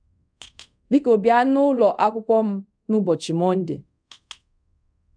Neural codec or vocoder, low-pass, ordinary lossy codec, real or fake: codec, 24 kHz, 0.5 kbps, DualCodec; 9.9 kHz; MP3, 96 kbps; fake